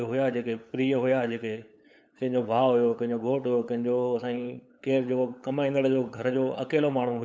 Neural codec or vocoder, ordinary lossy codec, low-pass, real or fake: codec, 16 kHz, 8 kbps, FunCodec, trained on LibriTTS, 25 frames a second; none; none; fake